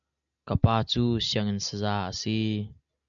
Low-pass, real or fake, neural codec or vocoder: 7.2 kHz; real; none